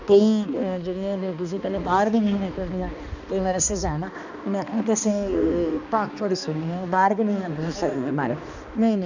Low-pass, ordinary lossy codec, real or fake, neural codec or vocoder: 7.2 kHz; none; fake; codec, 16 kHz, 2 kbps, X-Codec, HuBERT features, trained on balanced general audio